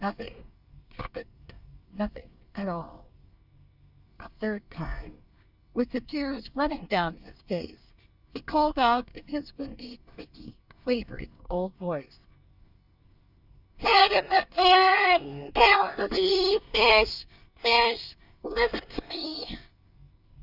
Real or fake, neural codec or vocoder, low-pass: fake; codec, 24 kHz, 1 kbps, SNAC; 5.4 kHz